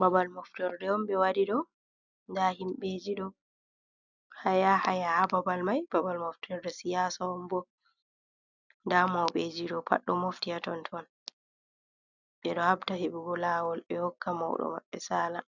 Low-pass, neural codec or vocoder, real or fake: 7.2 kHz; none; real